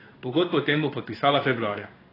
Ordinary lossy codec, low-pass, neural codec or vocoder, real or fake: AAC, 24 kbps; 5.4 kHz; codec, 16 kHz in and 24 kHz out, 1 kbps, XY-Tokenizer; fake